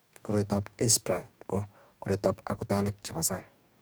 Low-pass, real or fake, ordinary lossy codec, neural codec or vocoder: none; fake; none; codec, 44.1 kHz, 2.6 kbps, DAC